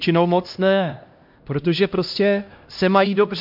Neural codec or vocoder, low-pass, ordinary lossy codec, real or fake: codec, 16 kHz, 1 kbps, X-Codec, HuBERT features, trained on LibriSpeech; 5.4 kHz; MP3, 48 kbps; fake